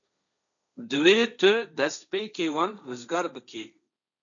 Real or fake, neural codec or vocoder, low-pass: fake; codec, 16 kHz, 1.1 kbps, Voila-Tokenizer; 7.2 kHz